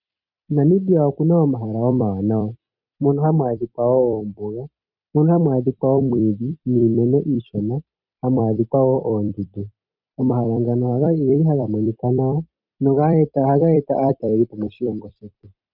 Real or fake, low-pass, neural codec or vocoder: fake; 5.4 kHz; vocoder, 44.1 kHz, 128 mel bands every 256 samples, BigVGAN v2